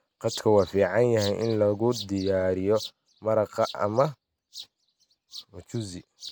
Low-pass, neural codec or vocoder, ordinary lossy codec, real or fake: none; none; none; real